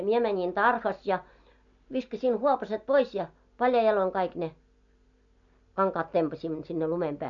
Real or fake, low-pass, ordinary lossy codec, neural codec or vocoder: real; 7.2 kHz; none; none